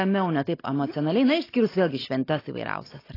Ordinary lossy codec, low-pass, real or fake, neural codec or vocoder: AAC, 24 kbps; 5.4 kHz; real; none